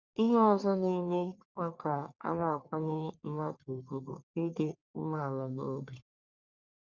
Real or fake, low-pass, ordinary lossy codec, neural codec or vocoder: fake; 7.2 kHz; Opus, 64 kbps; codec, 44.1 kHz, 1.7 kbps, Pupu-Codec